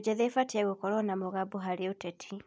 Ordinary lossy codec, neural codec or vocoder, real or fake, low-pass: none; none; real; none